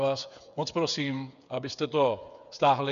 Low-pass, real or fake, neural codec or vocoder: 7.2 kHz; fake; codec, 16 kHz, 8 kbps, FreqCodec, smaller model